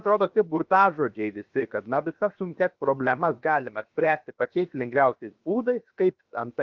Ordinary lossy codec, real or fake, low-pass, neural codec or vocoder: Opus, 24 kbps; fake; 7.2 kHz; codec, 16 kHz, 0.7 kbps, FocalCodec